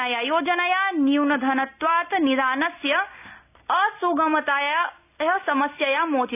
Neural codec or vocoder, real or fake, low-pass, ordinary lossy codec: none; real; 3.6 kHz; none